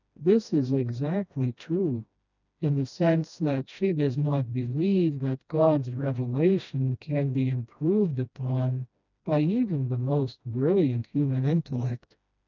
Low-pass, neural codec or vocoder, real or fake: 7.2 kHz; codec, 16 kHz, 1 kbps, FreqCodec, smaller model; fake